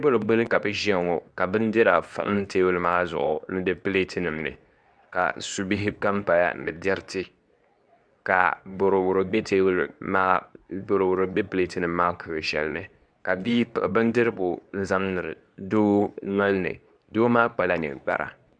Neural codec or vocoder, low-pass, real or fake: codec, 24 kHz, 0.9 kbps, WavTokenizer, medium speech release version 2; 9.9 kHz; fake